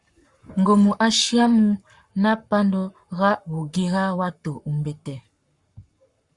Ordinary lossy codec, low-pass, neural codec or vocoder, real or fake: Opus, 64 kbps; 10.8 kHz; codec, 44.1 kHz, 7.8 kbps, Pupu-Codec; fake